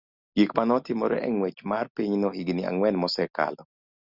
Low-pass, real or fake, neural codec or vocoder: 5.4 kHz; real; none